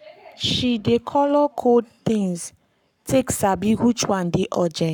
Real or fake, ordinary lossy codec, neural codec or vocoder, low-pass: fake; none; codec, 44.1 kHz, 7.8 kbps, Pupu-Codec; 19.8 kHz